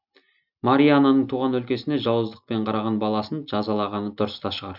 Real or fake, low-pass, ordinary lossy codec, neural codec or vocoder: real; 5.4 kHz; none; none